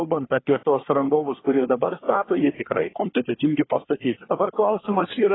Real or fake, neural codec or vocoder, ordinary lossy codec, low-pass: fake; codec, 24 kHz, 1 kbps, SNAC; AAC, 16 kbps; 7.2 kHz